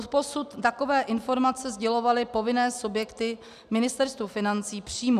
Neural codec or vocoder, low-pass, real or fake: none; 14.4 kHz; real